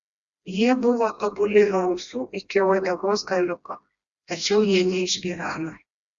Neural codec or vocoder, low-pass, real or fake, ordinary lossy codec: codec, 16 kHz, 1 kbps, FreqCodec, smaller model; 7.2 kHz; fake; Opus, 64 kbps